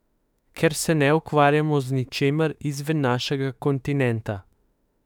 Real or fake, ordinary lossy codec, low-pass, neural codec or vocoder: fake; none; 19.8 kHz; autoencoder, 48 kHz, 32 numbers a frame, DAC-VAE, trained on Japanese speech